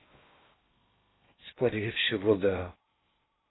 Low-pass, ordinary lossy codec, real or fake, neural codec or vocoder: 7.2 kHz; AAC, 16 kbps; fake; codec, 16 kHz in and 24 kHz out, 0.6 kbps, FocalCodec, streaming, 2048 codes